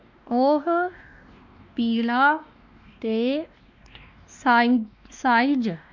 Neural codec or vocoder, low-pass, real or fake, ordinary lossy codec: codec, 16 kHz, 2 kbps, X-Codec, WavLM features, trained on Multilingual LibriSpeech; 7.2 kHz; fake; MP3, 64 kbps